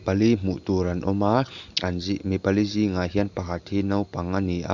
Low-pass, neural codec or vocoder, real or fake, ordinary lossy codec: 7.2 kHz; none; real; none